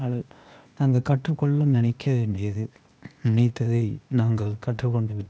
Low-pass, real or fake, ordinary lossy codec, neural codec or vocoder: none; fake; none; codec, 16 kHz, 0.8 kbps, ZipCodec